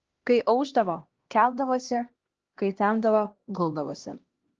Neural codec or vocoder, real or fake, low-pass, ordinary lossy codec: codec, 16 kHz, 1 kbps, X-Codec, HuBERT features, trained on LibriSpeech; fake; 7.2 kHz; Opus, 16 kbps